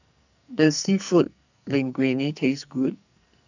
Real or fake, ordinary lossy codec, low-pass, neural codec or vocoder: fake; none; 7.2 kHz; codec, 44.1 kHz, 2.6 kbps, SNAC